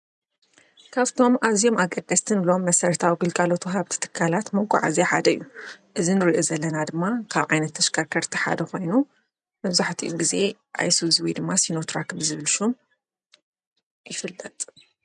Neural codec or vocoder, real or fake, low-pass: none; real; 10.8 kHz